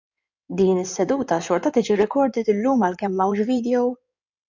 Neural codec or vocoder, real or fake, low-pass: codec, 16 kHz in and 24 kHz out, 2.2 kbps, FireRedTTS-2 codec; fake; 7.2 kHz